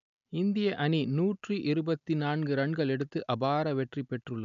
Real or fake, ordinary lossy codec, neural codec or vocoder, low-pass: real; none; none; 7.2 kHz